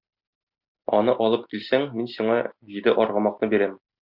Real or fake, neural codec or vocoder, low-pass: real; none; 5.4 kHz